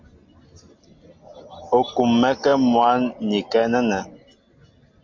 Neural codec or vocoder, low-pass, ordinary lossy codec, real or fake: none; 7.2 kHz; MP3, 48 kbps; real